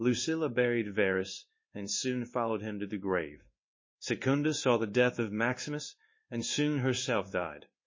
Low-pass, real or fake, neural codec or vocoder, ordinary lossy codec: 7.2 kHz; fake; codec, 16 kHz in and 24 kHz out, 1 kbps, XY-Tokenizer; MP3, 32 kbps